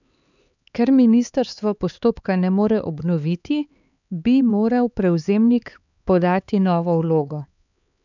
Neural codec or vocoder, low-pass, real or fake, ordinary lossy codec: codec, 16 kHz, 4 kbps, X-Codec, HuBERT features, trained on LibriSpeech; 7.2 kHz; fake; none